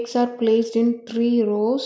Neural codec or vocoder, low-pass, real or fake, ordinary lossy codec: none; none; real; none